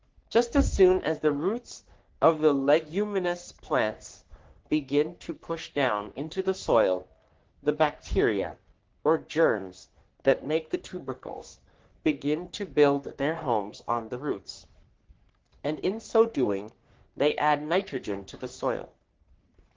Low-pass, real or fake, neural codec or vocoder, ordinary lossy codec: 7.2 kHz; fake; codec, 44.1 kHz, 3.4 kbps, Pupu-Codec; Opus, 16 kbps